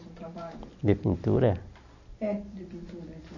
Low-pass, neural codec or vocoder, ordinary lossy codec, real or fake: 7.2 kHz; none; none; real